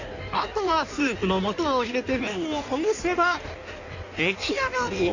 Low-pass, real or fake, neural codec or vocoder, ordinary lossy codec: 7.2 kHz; fake; codec, 16 kHz in and 24 kHz out, 1.1 kbps, FireRedTTS-2 codec; none